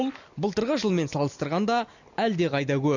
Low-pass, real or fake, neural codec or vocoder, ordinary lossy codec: 7.2 kHz; real; none; none